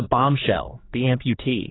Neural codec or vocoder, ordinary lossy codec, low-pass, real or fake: codec, 16 kHz, 4 kbps, X-Codec, HuBERT features, trained on general audio; AAC, 16 kbps; 7.2 kHz; fake